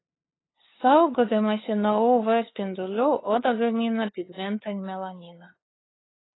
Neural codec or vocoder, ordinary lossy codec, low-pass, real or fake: codec, 16 kHz, 8 kbps, FunCodec, trained on LibriTTS, 25 frames a second; AAC, 16 kbps; 7.2 kHz; fake